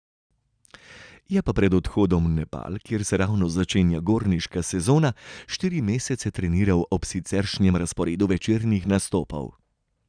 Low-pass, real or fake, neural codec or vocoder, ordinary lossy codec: 9.9 kHz; real; none; none